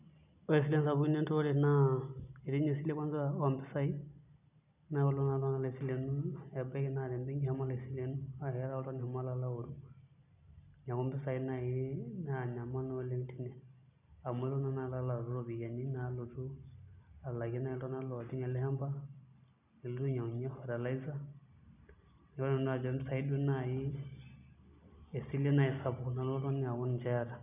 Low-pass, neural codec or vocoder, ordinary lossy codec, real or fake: 3.6 kHz; none; none; real